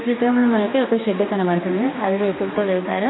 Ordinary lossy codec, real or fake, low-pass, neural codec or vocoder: AAC, 16 kbps; fake; 7.2 kHz; codec, 16 kHz, 2 kbps, X-Codec, HuBERT features, trained on general audio